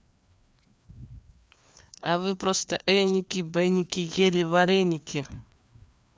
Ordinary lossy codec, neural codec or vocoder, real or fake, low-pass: none; codec, 16 kHz, 2 kbps, FreqCodec, larger model; fake; none